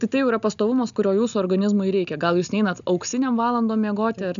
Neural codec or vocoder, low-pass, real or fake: none; 7.2 kHz; real